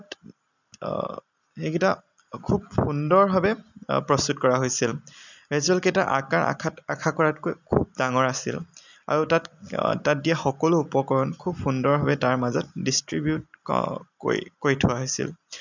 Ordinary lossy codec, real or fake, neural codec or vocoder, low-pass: none; real; none; 7.2 kHz